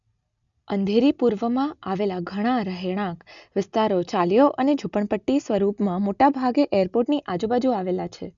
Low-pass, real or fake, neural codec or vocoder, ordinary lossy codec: 7.2 kHz; real; none; none